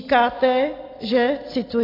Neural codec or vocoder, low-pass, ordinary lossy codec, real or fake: none; 5.4 kHz; AAC, 24 kbps; real